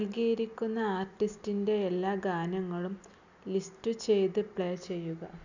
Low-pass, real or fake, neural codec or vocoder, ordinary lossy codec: 7.2 kHz; real; none; none